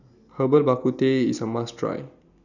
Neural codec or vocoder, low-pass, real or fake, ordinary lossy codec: none; 7.2 kHz; real; none